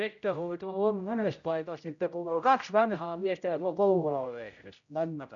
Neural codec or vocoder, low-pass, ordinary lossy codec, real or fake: codec, 16 kHz, 0.5 kbps, X-Codec, HuBERT features, trained on general audio; 7.2 kHz; none; fake